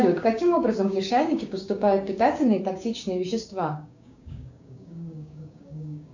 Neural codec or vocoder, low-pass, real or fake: codec, 16 kHz, 6 kbps, DAC; 7.2 kHz; fake